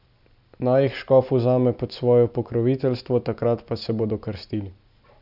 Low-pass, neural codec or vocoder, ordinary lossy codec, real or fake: 5.4 kHz; none; none; real